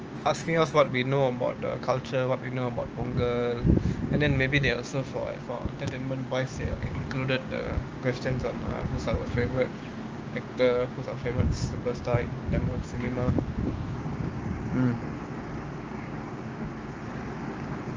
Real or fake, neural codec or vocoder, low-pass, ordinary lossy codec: fake; codec, 16 kHz, 6 kbps, DAC; 7.2 kHz; Opus, 24 kbps